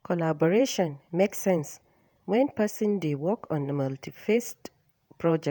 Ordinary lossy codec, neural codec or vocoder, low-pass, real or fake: none; vocoder, 48 kHz, 128 mel bands, Vocos; none; fake